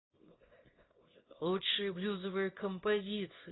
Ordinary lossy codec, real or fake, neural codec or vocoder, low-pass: AAC, 16 kbps; fake; codec, 24 kHz, 0.9 kbps, WavTokenizer, small release; 7.2 kHz